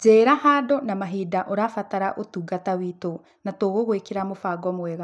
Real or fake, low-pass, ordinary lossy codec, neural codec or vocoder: real; none; none; none